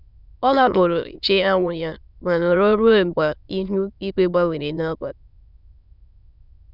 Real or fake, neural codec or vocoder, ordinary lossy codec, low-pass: fake; autoencoder, 22.05 kHz, a latent of 192 numbers a frame, VITS, trained on many speakers; none; 5.4 kHz